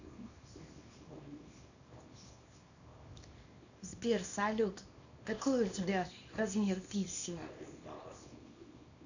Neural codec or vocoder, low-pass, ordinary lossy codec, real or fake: codec, 24 kHz, 0.9 kbps, WavTokenizer, small release; 7.2 kHz; none; fake